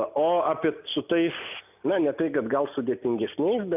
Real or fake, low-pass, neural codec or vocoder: real; 3.6 kHz; none